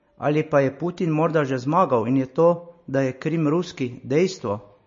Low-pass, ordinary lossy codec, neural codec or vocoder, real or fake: 7.2 kHz; MP3, 32 kbps; none; real